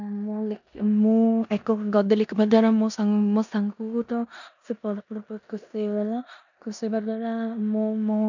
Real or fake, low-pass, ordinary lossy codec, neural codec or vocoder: fake; 7.2 kHz; none; codec, 16 kHz in and 24 kHz out, 0.9 kbps, LongCat-Audio-Codec, four codebook decoder